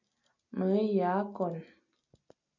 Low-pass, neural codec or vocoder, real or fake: 7.2 kHz; none; real